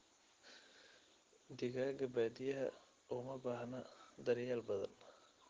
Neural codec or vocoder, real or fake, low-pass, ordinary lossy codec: none; real; 7.2 kHz; Opus, 16 kbps